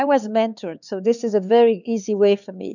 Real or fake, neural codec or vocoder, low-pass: fake; codec, 16 kHz, 4 kbps, X-Codec, HuBERT features, trained on LibriSpeech; 7.2 kHz